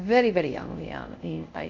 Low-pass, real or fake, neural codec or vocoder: 7.2 kHz; fake; codec, 24 kHz, 0.5 kbps, DualCodec